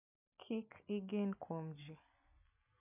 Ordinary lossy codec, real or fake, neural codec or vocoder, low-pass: none; real; none; 3.6 kHz